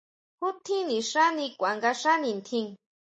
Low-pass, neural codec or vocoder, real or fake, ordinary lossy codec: 10.8 kHz; none; real; MP3, 32 kbps